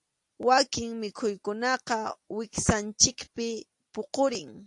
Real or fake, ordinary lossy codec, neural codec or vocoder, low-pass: real; AAC, 64 kbps; none; 10.8 kHz